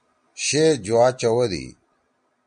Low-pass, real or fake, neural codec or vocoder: 9.9 kHz; real; none